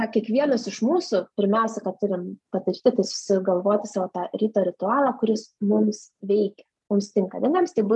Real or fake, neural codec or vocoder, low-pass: real; none; 10.8 kHz